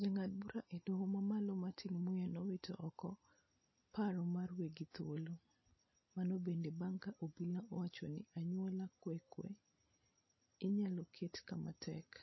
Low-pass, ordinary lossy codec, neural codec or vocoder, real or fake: 7.2 kHz; MP3, 24 kbps; none; real